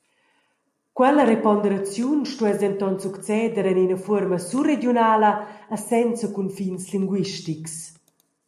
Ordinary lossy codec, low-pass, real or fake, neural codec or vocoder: MP3, 64 kbps; 14.4 kHz; real; none